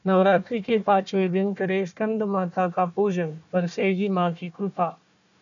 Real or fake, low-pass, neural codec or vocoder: fake; 7.2 kHz; codec, 16 kHz, 1 kbps, FunCodec, trained on Chinese and English, 50 frames a second